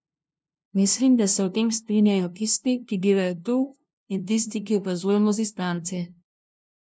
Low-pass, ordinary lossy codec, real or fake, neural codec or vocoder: none; none; fake; codec, 16 kHz, 0.5 kbps, FunCodec, trained on LibriTTS, 25 frames a second